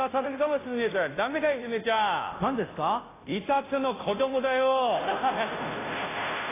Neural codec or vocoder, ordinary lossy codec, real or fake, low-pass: codec, 16 kHz, 0.5 kbps, FunCodec, trained on Chinese and English, 25 frames a second; AAC, 16 kbps; fake; 3.6 kHz